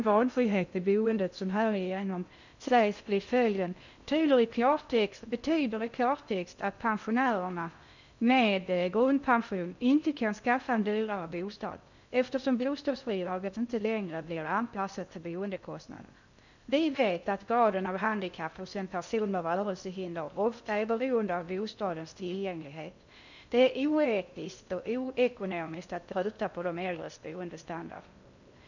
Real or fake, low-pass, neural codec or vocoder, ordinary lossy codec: fake; 7.2 kHz; codec, 16 kHz in and 24 kHz out, 0.6 kbps, FocalCodec, streaming, 2048 codes; none